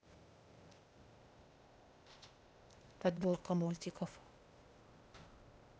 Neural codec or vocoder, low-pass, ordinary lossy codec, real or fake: codec, 16 kHz, 0.8 kbps, ZipCodec; none; none; fake